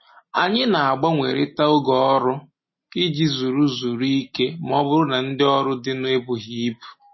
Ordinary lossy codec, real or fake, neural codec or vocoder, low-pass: MP3, 24 kbps; real; none; 7.2 kHz